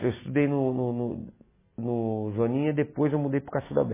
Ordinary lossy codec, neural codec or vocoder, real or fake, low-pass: MP3, 16 kbps; none; real; 3.6 kHz